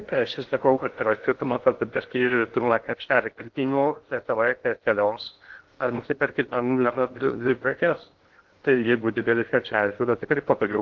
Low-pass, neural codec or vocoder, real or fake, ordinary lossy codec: 7.2 kHz; codec, 16 kHz in and 24 kHz out, 0.6 kbps, FocalCodec, streaming, 2048 codes; fake; Opus, 16 kbps